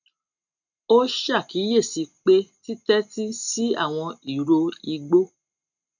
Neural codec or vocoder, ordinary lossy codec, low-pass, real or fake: none; none; 7.2 kHz; real